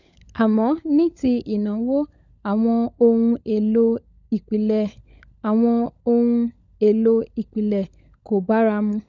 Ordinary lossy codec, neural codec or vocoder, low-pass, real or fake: none; codec, 16 kHz, 16 kbps, FunCodec, trained on LibriTTS, 50 frames a second; 7.2 kHz; fake